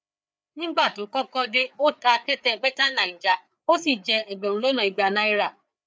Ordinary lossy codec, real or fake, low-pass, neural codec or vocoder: none; fake; none; codec, 16 kHz, 4 kbps, FreqCodec, larger model